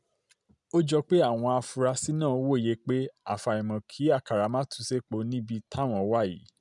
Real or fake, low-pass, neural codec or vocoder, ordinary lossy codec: real; 10.8 kHz; none; none